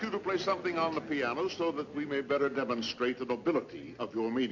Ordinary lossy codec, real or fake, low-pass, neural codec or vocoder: MP3, 64 kbps; real; 7.2 kHz; none